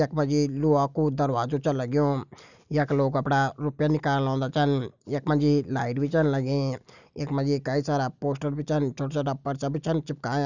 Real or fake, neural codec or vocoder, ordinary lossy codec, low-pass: real; none; none; 7.2 kHz